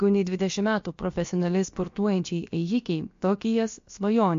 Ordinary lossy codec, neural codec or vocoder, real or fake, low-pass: MP3, 48 kbps; codec, 16 kHz, about 1 kbps, DyCAST, with the encoder's durations; fake; 7.2 kHz